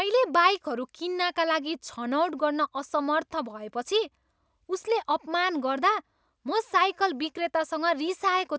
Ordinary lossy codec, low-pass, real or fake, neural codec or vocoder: none; none; real; none